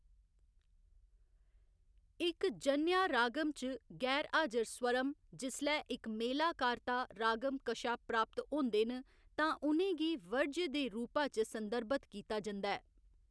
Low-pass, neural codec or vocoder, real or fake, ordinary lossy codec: none; none; real; none